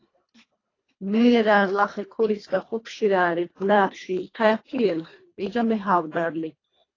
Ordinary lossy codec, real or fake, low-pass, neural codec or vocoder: AAC, 32 kbps; fake; 7.2 kHz; codec, 24 kHz, 1.5 kbps, HILCodec